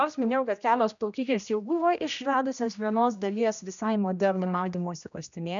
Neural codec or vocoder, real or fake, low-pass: codec, 16 kHz, 1 kbps, X-Codec, HuBERT features, trained on general audio; fake; 7.2 kHz